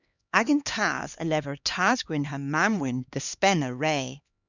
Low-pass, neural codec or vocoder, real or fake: 7.2 kHz; codec, 16 kHz, 2 kbps, X-Codec, HuBERT features, trained on LibriSpeech; fake